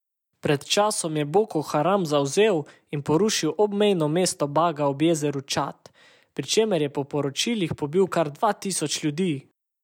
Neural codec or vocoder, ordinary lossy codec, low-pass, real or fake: none; none; 19.8 kHz; real